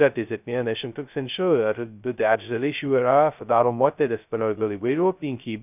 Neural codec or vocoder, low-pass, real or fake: codec, 16 kHz, 0.2 kbps, FocalCodec; 3.6 kHz; fake